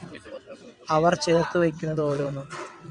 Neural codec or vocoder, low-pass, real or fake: vocoder, 22.05 kHz, 80 mel bands, WaveNeXt; 9.9 kHz; fake